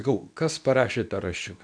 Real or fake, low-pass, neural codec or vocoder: fake; 9.9 kHz; codec, 24 kHz, 0.9 kbps, WavTokenizer, medium speech release version 2